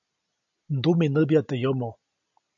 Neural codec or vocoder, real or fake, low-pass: none; real; 7.2 kHz